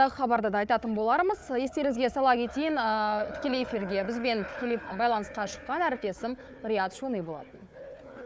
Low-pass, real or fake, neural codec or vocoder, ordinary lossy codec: none; fake; codec, 16 kHz, 4 kbps, FunCodec, trained on Chinese and English, 50 frames a second; none